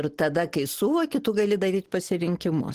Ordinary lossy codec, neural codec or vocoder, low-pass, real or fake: Opus, 16 kbps; none; 14.4 kHz; real